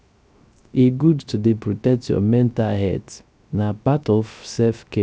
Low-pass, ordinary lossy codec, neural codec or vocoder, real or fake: none; none; codec, 16 kHz, 0.3 kbps, FocalCodec; fake